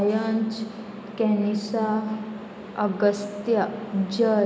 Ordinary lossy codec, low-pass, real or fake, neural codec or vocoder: none; none; real; none